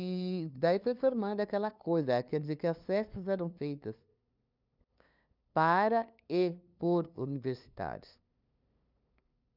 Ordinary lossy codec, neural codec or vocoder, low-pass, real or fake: none; codec, 16 kHz, 2 kbps, FunCodec, trained on LibriTTS, 25 frames a second; 5.4 kHz; fake